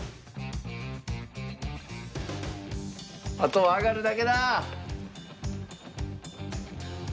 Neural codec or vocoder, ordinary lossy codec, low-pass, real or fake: none; none; none; real